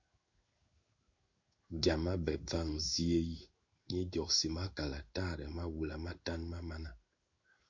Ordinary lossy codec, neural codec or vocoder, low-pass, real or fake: Opus, 64 kbps; codec, 16 kHz in and 24 kHz out, 1 kbps, XY-Tokenizer; 7.2 kHz; fake